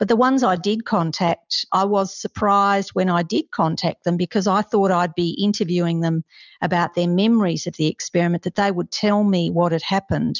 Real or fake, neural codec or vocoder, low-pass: real; none; 7.2 kHz